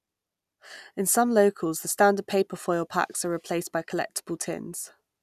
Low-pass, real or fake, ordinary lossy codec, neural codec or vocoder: 14.4 kHz; real; none; none